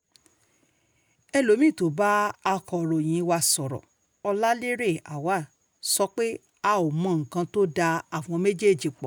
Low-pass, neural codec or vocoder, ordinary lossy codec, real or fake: none; none; none; real